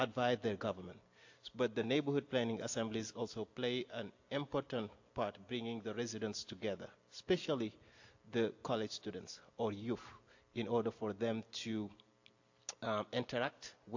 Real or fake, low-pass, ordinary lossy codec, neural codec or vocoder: real; 7.2 kHz; AAC, 48 kbps; none